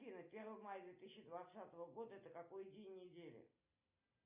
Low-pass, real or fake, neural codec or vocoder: 3.6 kHz; real; none